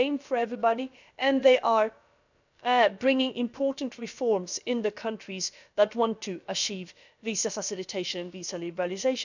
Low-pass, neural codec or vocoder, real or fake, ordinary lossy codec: 7.2 kHz; codec, 16 kHz, about 1 kbps, DyCAST, with the encoder's durations; fake; none